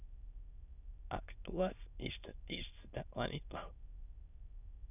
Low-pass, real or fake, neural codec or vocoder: 3.6 kHz; fake; autoencoder, 22.05 kHz, a latent of 192 numbers a frame, VITS, trained on many speakers